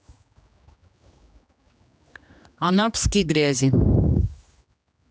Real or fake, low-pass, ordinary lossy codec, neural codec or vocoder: fake; none; none; codec, 16 kHz, 2 kbps, X-Codec, HuBERT features, trained on general audio